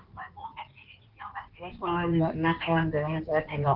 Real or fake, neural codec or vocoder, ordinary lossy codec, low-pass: fake; codec, 16 kHz in and 24 kHz out, 1.1 kbps, FireRedTTS-2 codec; Opus, 16 kbps; 5.4 kHz